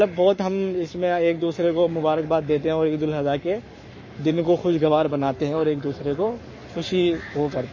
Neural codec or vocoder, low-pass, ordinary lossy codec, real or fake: codec, 44.1 kHz, 7.8 kbps, Pupu-Codec; 7.2 kHz; MP3, 32 kbps; fake